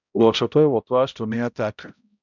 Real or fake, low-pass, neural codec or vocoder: fake; 7.2 kHz; codec, 16 kHz, 0.5 kbps, X-Codec, HuBERT features, trained on balanced general audio